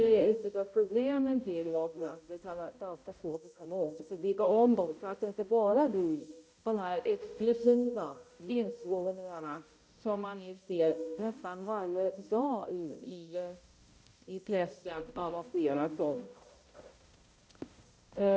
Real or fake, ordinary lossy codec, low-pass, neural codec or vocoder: fake; none; none; codec, 16 kHz, 0.5 kbps, X-Codec, HuBERT features, trained on balanced general audio